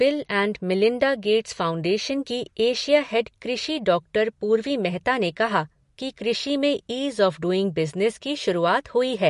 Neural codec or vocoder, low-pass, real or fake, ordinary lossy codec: none; 10.8 kHz; real; MP3, 48 kbps